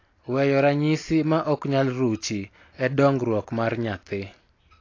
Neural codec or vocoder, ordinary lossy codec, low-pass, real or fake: none; AAC, 32 kbps; 7.2 kHz; real